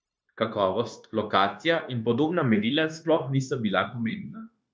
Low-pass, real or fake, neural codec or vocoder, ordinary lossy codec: none; fake; codec, 16 kHz, 0.9 kbps, LongCat-Audio-Codec; none